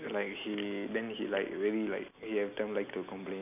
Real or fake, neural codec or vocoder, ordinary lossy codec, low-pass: real; none; none; 3.6 kHz